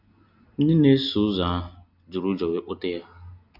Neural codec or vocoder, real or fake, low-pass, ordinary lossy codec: none; real; 5.4 kHz; none